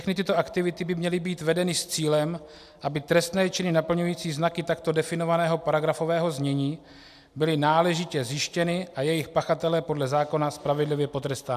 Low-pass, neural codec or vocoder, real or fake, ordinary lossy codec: 14.4 kHz; none; real; AAC, 96 kbps